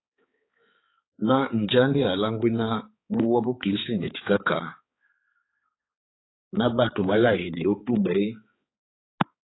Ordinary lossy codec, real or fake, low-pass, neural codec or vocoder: AAC, 16 kbps; fake; 7.2 kHz; codec, 16 kHz, 4 kbps, X-Codec, HuBERT features, trained on balanced general audio